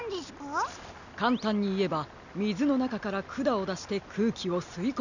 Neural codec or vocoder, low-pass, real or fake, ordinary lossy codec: none; 7.2 kHz; real; none